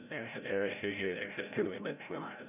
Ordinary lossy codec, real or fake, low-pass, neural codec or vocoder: none; fake; 3.6 kHz; codec, 16 kHz, 0.5 kbps, FreqCodec, larger model